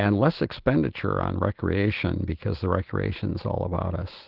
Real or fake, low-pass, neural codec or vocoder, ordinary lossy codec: real; 5.4 kHz; none; Opus, 16 kbps